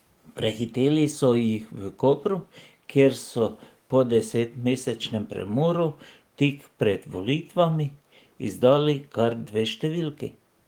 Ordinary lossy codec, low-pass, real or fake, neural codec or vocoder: Opus, 24 kbps; 19.8 kHz; fake; codec, 44.1 kHz, 7.8 kbps, DAC